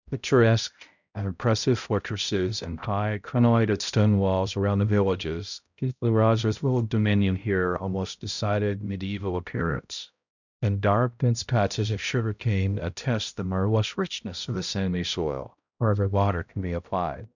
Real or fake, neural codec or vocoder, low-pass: fake; codec, 16 kHz, 0.5 kbps, X-Codec, HuBERT features, trained on balanced general audio; 7.2 kHz